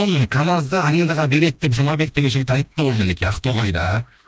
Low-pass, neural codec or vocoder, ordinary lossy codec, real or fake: none; codec, 16 kHz, 1 kbps, FreqCodec, smaller model; none; fake